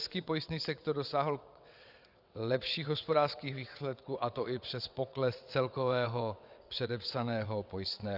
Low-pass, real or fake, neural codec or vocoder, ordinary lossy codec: 5.4 kHz; fake; vocoder, 22.05 kHz, 80 mel bands, WaveNeXt; Opus, 64 kbps